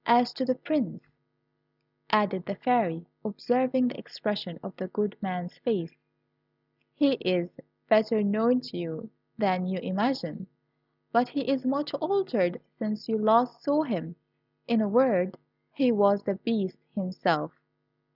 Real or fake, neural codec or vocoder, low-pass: real; none; 5.4 kHz